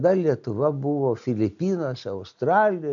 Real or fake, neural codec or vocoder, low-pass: real; none; 7.2 kHz